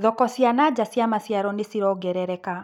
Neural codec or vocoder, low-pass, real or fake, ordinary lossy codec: none; 19.8 kHz; real; none